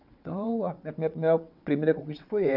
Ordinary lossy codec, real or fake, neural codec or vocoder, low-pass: none; fake; vocoder, 22.05 kHz, 80 mel bands, WaveNeXt; 5.4 kHz